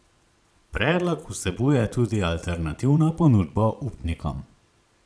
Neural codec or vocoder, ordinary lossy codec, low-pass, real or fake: vocoder, 22.05 kHz, 80 mel bands, Vocos; none; none; fake